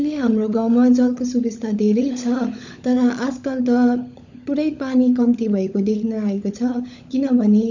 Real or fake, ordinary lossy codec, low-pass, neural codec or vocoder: fake; none; 7.2 kHz; codec, 16 kHz, 16 kbps, FunCodec, trained on LibriTTS, 50 frames a second